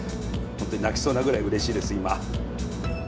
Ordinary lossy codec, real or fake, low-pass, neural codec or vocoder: none; real; none; none